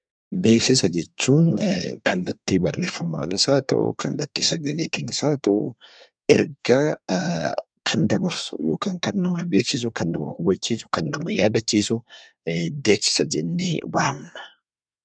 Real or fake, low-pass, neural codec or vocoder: fake; 9.9 kHz; codec, 24 kHz, 1 kbps, SNAC